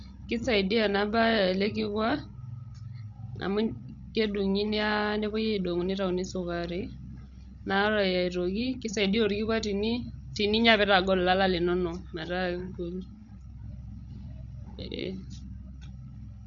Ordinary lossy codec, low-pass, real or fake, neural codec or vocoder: none; 7.2 kHz; fake; codec, 16 kHz, 16 kbps, FreqCodec, larger model